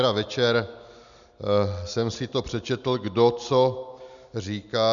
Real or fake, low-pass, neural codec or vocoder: real; 7.2 kHz; none